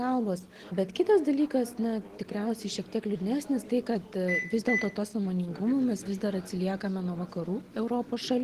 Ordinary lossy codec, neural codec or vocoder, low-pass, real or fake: Opus, 16 kbps; none; 14.4 kHz; real